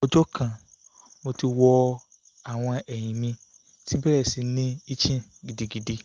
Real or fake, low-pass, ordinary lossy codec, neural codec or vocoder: real; 7.2 kHz; Opus, 32 kbps; none